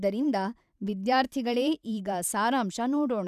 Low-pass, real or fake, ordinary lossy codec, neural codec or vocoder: 14.4 kHz; fake; none; vocoder, 44.1 kHz, 128 mel bands every 512 samples, BigVGAN v2